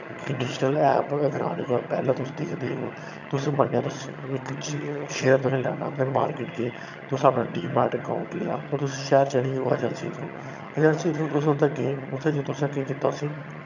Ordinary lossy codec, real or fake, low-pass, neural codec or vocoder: none; fake; 7.2 kHz; vocoder, 22.05 kHz, 80 mel bands, HiFi-GAN